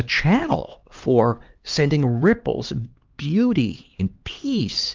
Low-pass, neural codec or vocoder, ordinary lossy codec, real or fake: 7.2 kHz; codec, 16 kHz, 2 kbps, X-Codec, HuBERT features, trained on LibriSpeech; Opus, 32 kbps; fake